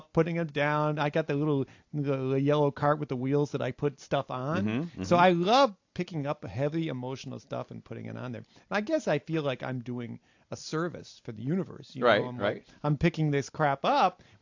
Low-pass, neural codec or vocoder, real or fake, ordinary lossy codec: 7.2 kHz; none; real; AAC, 48 kbps